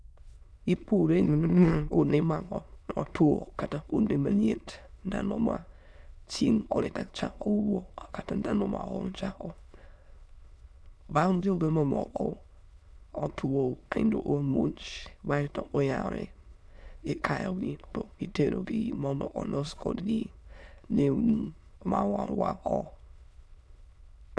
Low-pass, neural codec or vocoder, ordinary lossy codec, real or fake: none; autoencoder, 22.05 kHz, a latent of 192 numbers a frame, VITS, trained on many speakers; none; fake